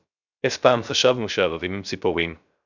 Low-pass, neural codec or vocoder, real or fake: 7.2 kHz; codec, 16 kHz, 0.3 kbps, FocalCodec; fake